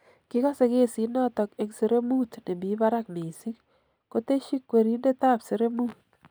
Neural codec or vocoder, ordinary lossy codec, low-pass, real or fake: none; none; none; real